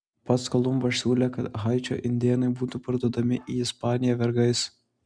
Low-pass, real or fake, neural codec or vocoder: 9.9 kHz; real; none